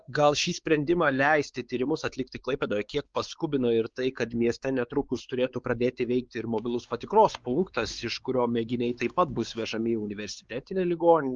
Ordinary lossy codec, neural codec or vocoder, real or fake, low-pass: Opus, 32 kbps; codec, 16 kHz, 4 kbps, X-Codec, WavLM features, trained on Multilingual LibriSpeech; fake; 7.2 kHz